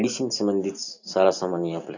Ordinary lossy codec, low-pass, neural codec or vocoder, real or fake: none; 7.2 kHz; none; real